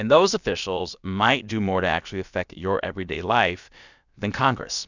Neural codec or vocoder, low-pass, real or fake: codec, 16 kHz, about 1 kbps, DyCAST, with the encoder's durations; 7.2 kHz; fake